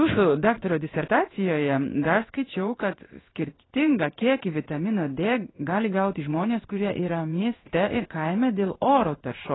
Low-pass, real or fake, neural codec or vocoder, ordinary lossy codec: 7.2 kHz; fake; codec, 16 kHz in and 24 kHz out, 1 kbps, XY-Tokenizer; AAC, 16 kbps